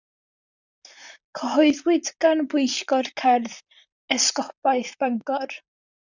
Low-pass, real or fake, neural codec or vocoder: 7.2 kHz; fake; vocoder, 44.1 kHz, 128 mel bands, Pupu-Vocoder